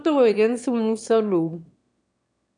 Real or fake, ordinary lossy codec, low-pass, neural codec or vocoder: fake; MP3, 96 kbps; 9.9 kHz; autoencoder, 22.05 kHz, a latent of 192 numbers a frame, VITS, trained on one speaker